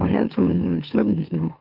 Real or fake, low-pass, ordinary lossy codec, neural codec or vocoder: fake; 5.4 kHz; Opus, 32 kbps; autoencoder, 44.1 kHz, a latent of 192 numbers a frame, MeloTTS